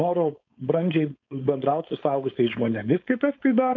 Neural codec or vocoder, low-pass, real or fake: codec, 16 kHz, 4.8 kbps, FACodec; 7.2 kHz; fake